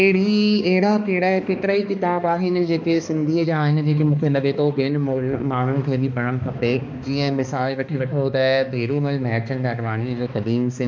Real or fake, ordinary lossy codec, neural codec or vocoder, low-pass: fake; none; codec, 16 kHz, 2 kbps, X-Codec, HuBERT features, trained on balanced general audio; none